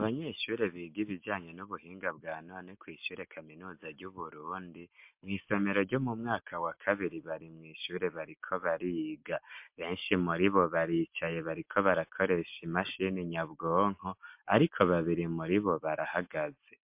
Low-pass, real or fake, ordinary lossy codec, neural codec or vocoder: 3.6 kHz; real; MP3, 32 kbps; none